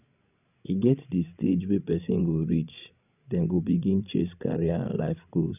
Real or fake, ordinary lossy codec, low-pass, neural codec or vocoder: fake; none; 3.6 kHz; codec, 16 kHz, 16 kbps, FreqCodec, larger model